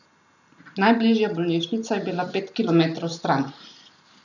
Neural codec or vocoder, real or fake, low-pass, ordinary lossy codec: none; real; 7.2 kHz; none